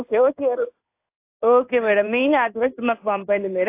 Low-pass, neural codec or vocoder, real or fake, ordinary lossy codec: 3.6 kHz; codec, 16 kHz in and 24 kHz out, 1 kbps, XY-Tokenizer; fake; AAC, 24 kbps